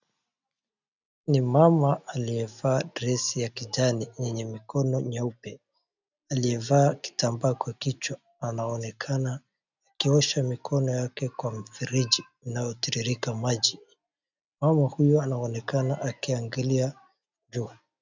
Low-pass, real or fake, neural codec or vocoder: 7.2 kHz; real; none